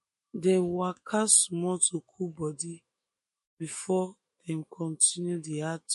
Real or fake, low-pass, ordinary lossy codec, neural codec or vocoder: fake; 14.4 kHz; MP3, 48 kbps; autoencoder, 48 kHz, 128 numbers a frame, DAC-VAE, trained on Japanese speech